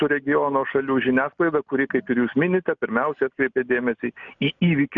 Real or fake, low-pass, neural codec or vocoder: real; 7.2 kHz; none